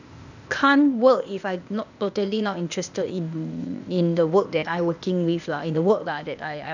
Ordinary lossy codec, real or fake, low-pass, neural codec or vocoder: none; fake; 7.2 kHz; codec, 16 kHz, 0.8 kbps, ZipCodec